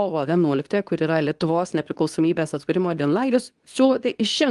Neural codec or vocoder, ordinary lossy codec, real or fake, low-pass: codec, 24 kHz, 0.9 kbps, WavTokenizer, medium speech release version 2; Opus, 32 kbps; fake; 10.8 kHz